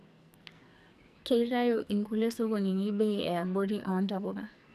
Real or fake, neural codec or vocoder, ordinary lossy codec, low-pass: fake; codec, 44.1 kHz, 2.6 kbps, SNAC; none; 14.4 kHz